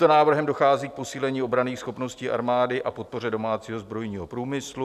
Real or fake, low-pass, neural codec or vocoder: real; 14.4 kHz; none